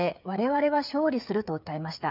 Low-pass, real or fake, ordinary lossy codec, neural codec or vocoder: 5.4 kHz; fake; none; codec, 16 kHz, 8 kbps, FreqCodec, smaller model